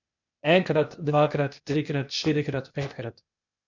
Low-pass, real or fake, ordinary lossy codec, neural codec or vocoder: 7.2 kHz; fake; none; codec, 16 kHz, 0.8 kbps, ZipCodec